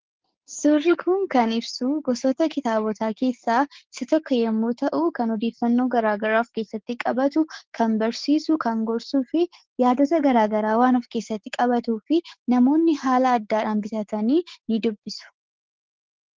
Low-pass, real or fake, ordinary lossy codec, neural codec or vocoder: 7.2 kHz; fake; Opus, 16 kbps; codec, 16 kHz, 6 kbps, DAC